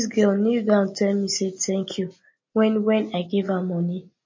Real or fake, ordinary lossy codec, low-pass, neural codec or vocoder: real; MP3, 32 kbps; 7.2 kHz; none